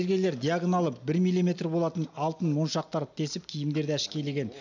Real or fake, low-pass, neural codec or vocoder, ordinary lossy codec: real; 7.2 kHz; none; none